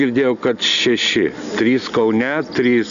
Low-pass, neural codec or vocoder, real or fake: 7.2 kHz; none; real